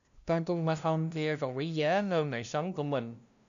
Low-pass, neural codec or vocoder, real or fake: 7.2 kHz; codec, 16 kHz, 0.5 kbps, FunCodec, trained on LibriTTS, 25 frames a second; fake